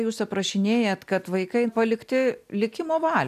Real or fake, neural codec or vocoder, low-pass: real; none; 14.4 kHz